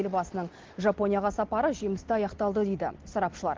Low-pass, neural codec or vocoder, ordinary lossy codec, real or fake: 7.2 kHz; none; Opus, 16 kbps; real